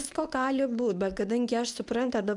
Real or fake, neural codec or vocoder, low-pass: fake; codec, 24 kHz, 0.9 kbps, WavTokenizer, medium speech release version 1; 10.8 kHz